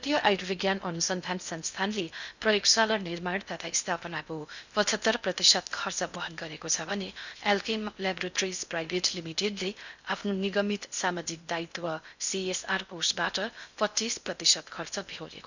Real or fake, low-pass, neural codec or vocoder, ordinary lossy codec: fake; 7.2 kHz; codec, 16 kHz in and 24 kHz out, 0.6 kbps, FocalCodec, streaming, 2048 codes; none